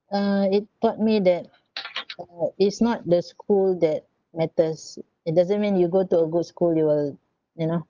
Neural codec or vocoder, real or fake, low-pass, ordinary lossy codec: none; real; 7.2 kHz; Opus, 32 kbps